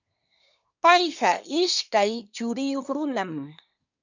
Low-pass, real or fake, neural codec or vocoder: 7.2 kHz; fake; codec, 24 kHz, 1 kbps, SNAC